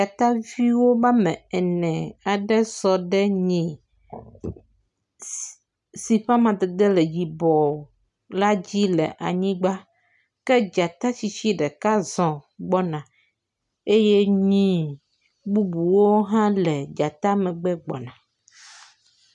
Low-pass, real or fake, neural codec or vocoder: 10.8 kHz; real; none